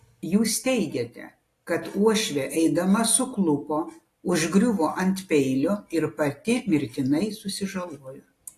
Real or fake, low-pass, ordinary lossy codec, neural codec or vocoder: real; 14.4 kHz; AAC, 48 kbps; none